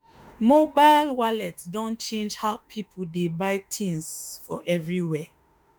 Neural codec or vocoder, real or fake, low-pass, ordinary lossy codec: autoencoder, 48 kHz, 32 numbers a frame, DAC-VAE, trained on Japanese speech; fake; none; none